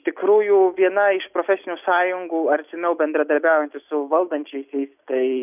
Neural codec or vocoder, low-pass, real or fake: none; 3.6 kHz; real